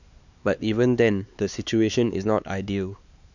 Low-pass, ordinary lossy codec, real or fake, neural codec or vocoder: 7.2 kHz; none; fake; codec, 16 kHz, 4 kbps, X-Codec, HuBERT features, trained on LibriSpeech